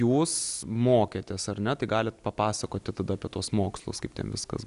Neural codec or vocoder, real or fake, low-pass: none; real; 10.8 kHz